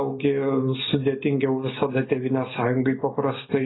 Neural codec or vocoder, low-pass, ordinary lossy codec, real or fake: none; 7.2 kHz; AAC, 16 kbps; real